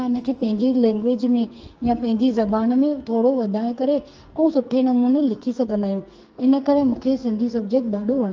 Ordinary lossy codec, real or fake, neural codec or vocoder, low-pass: Opus, 24 kbps; fake; codec, 44.1 kHz, 2.6 kbps, SNAC; 7.2 kHz